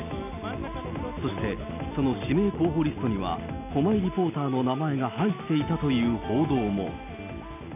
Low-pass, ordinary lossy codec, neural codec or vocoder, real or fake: 3.6 kHz; none; none; real